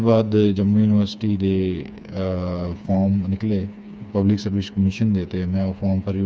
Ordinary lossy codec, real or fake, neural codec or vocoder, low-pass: none; fake; codec, 16 kHz, 4 kbps, FreqCodec, smaller model; none